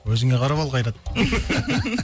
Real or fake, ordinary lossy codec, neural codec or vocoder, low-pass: real; none; none; none